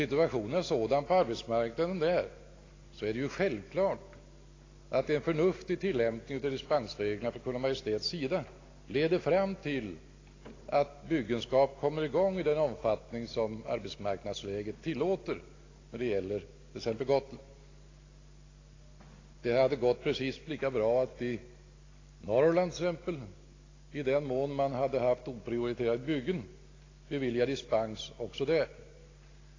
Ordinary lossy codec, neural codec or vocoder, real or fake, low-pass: AAC, 32 kbps; none; real; 7.2 kHz